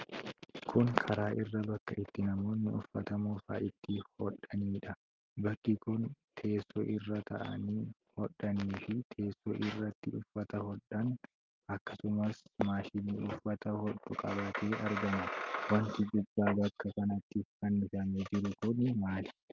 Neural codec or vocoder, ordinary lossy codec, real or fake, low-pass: none; Opus, 24 kbps; real; 7.2 kHz